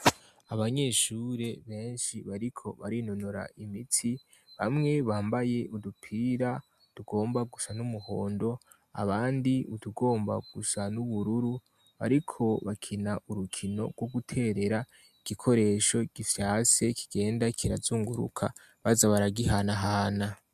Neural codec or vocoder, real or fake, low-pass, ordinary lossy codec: none; real; 14.4 kHz; MP3, 96 kbps